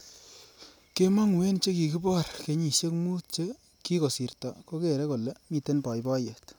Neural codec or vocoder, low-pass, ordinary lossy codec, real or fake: none; none; none; real